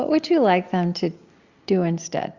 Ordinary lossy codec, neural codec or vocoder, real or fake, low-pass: Opus, 64 kbps; none; real; 7.2 kHz